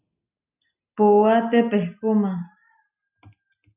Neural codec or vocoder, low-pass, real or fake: none; 3.6 kHz; real